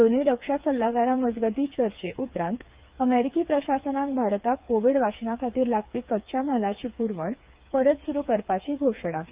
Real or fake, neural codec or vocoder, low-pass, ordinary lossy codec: fake; codec, 16 kHz, 4 kbps, FreqCodec, smaller model; 3.6 kHz; Opus, 24 kbps